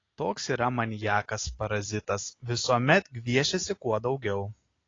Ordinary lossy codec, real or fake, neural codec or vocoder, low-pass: AAC, 32 kbps; real; none; 7.2 kHz